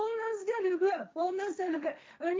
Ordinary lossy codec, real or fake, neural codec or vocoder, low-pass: none; fake; codec, 16 kHz, 1.1 kbps, Voila-Tokenizer; none